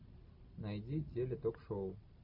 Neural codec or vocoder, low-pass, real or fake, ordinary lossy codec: none; 5.4 kHz; real; MP3, 48 kbps